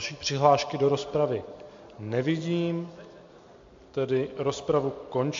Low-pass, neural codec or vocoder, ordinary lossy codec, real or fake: 7.2 kHz; none; MP3, 48 kbps; real